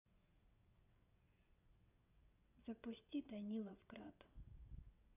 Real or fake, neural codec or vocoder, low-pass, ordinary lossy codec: fake; vocoder, 22.05 kHz, 80 mel bands, WaveNeXt; 3.6 kHz; none